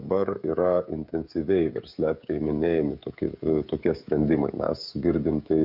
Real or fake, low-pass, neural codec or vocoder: fake; 5.4 kHz; codec, 16 kHz, 16 kbps, FreqCodec, smaller model